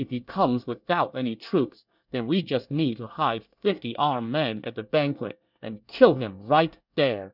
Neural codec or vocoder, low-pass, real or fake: codec, 24 kHz, 1 kbps, SNAC; 5.4 kHz; fake